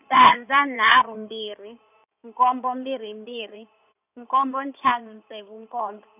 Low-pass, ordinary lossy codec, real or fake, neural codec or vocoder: 3.6 kHz; none; fake; codec, 16 kHz in and 24 kHz out, 2.2 kbps, FireRedTTS-2 codec